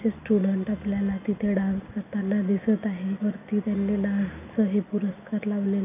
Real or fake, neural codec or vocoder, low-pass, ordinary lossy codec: real; none; 3.6 kHz; none